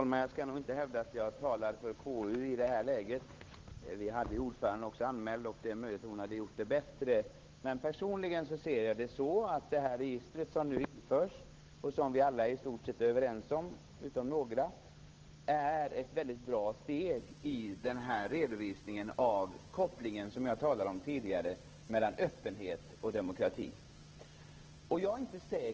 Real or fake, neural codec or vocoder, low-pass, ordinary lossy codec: fake; codec, 16 kHz, 8 kbps, FunCodec, trained on Chinese and English, 25 frames a second; 7.2 kHz; Opus, 16 kbps